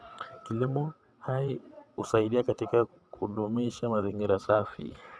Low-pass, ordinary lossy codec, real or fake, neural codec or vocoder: none; none; fake; vocoder, 22.05 kHz, 80 mel bands, WaveNeXt